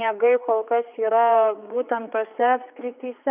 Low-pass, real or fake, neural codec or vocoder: 3.6 kHz; fake; codec, 16 kHz, 4 kbps, X-Codec, HuBERT features, trained on general audio